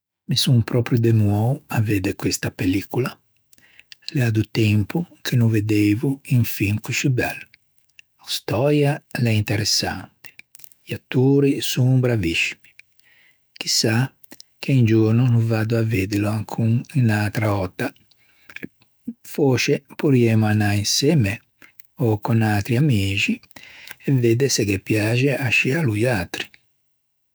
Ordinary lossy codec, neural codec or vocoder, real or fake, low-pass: none; autoencoder, 48 kHz, 128 numbers a frame, DAC-VAE, trained on Japanese speech; fake; none